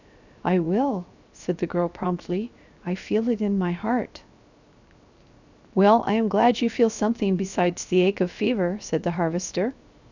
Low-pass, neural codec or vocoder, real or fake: 7.2 kHz; codec, 16 kHz, 0.7 kbps, FocalCodec; fake